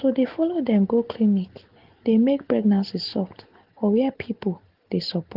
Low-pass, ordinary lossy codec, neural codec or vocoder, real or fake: 5.4 kHz; Opus, 16 kbps; none; real